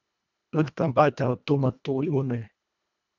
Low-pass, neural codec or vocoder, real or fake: 7.2 kHz; codec, 24 kHz, 1.5 kbps, HILCodec; fake